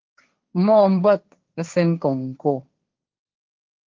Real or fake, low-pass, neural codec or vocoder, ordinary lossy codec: fake; 7.2 kHz; codec, 16 kHz, 1.1 kbps, Voila-Tokenizer; Opus, 32 kbps